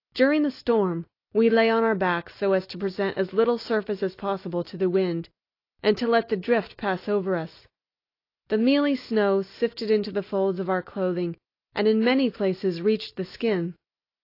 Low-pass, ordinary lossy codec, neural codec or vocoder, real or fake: 5.4 kHz; AAC, 32 kbps; none; real